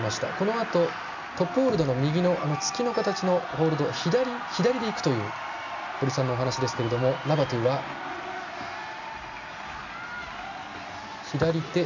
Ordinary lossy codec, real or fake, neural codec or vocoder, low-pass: none; real; none; 7.2 kHz